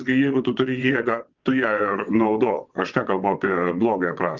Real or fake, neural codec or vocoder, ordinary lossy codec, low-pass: fake; vocoder, 22.05 kHz, 80 mel bands, WaveNeXt; Opus, 24 kbps; 7.2 kHz